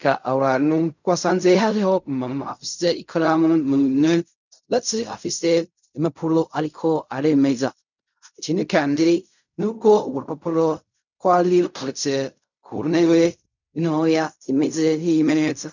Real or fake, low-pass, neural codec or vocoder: fake; 7.2 kHz; codec, 16 kHz in and 24 kHz out, 0.4 kbps, LongCat-Audio-Codec, fine tuned four codebook decoder